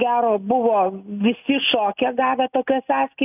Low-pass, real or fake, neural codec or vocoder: 3.6 kHz; real; none